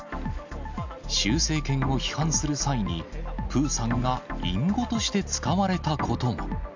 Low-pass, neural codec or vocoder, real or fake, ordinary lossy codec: 7.2 kHz; none; real; none